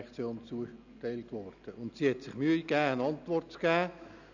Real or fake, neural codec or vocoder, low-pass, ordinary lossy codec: real; none; 7.2 kHz; none